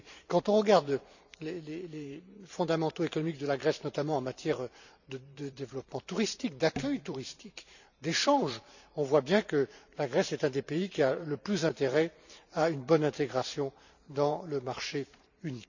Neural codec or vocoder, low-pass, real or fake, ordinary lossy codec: vocoder, 44.1 kHz, 128 mel bands every 512 samples, BigVGAN v2; 7.2 kHz; fake; none